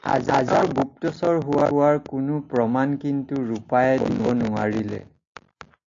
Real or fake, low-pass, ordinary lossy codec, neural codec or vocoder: real; 7.2 kHz; AAC, 48 kbps; none